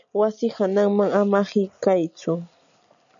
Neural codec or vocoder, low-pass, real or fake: none; 7.2 kHz; real